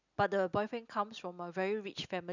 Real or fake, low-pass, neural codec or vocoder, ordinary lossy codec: real; 7.2 kHz; none; none